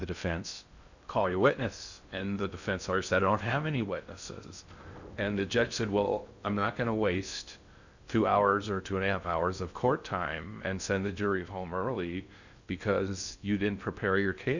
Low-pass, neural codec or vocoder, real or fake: 7.2 kHz; codec, 16 kHz in and 24 kHz out, 0.6 kbps, FocalCodec, streaming, 2048 codes; fake